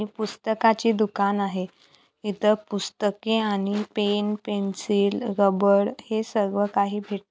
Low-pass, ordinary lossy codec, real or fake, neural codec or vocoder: none; none; real; none